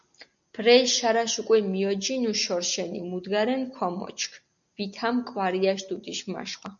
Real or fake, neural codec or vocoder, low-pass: real; none; 7.2 kHz